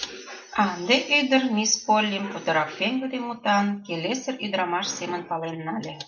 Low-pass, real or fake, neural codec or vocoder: 7.2 kHz; real; none